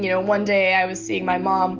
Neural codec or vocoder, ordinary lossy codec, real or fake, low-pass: none; Opus, 24 kbps; real; 7.2 kHz